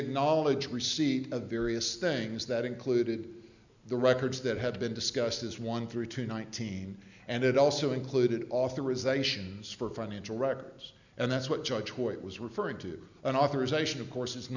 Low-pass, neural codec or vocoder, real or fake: 7.2 kHz; none; real